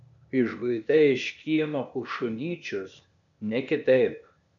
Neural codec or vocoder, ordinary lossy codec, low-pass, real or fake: codec, 16 kHz, 0.8 kbps, ZipCodec; MP3, 96 kbps; 7.2 kHz; fake